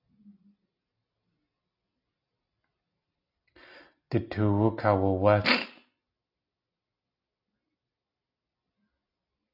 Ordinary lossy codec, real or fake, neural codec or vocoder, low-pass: AAC, 48 kbps; real; none; 5.4 kHz